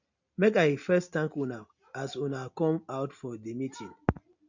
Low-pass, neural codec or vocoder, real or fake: 7.2 kHz; none; real